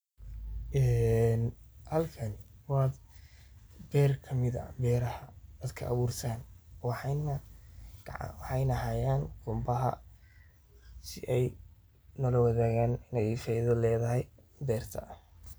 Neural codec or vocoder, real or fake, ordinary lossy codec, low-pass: none; real; none; none